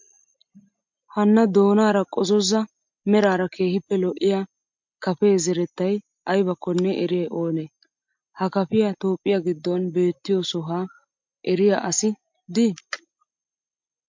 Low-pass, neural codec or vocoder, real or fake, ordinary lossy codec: 7.2 kHz; none; real; MP3, 48 kbps